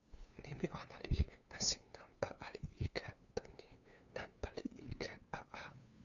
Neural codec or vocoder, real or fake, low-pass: codec, 16 kHz, 2 kbps, FunCodec, trained on LibriTTS, 25 frames a second; fake; 7.2 kHz